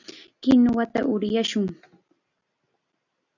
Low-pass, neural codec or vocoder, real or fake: 7.2 kHz; none; real